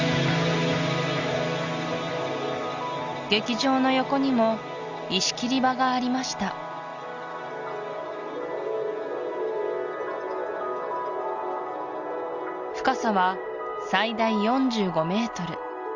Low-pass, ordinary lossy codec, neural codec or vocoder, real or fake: 7.2 kHz; Opus, 64 kbps; none; real